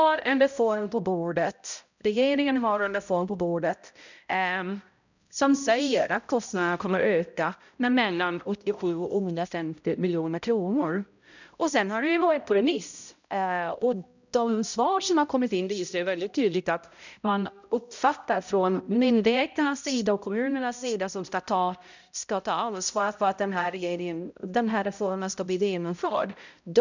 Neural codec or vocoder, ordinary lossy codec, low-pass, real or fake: codec, 16 kHz, 0.5 kbps, X-Codec, HuBERT features, trained on balanced general audio; none; 7.2 kHz; fake